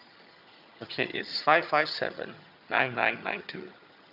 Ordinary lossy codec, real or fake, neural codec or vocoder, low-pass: none; fake; vocoder, 22.05 kHz, 80 mel bands, HiFi-GAN; 5.4 kHz